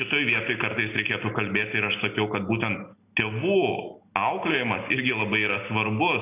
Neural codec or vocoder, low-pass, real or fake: none; 3.6 kHz; real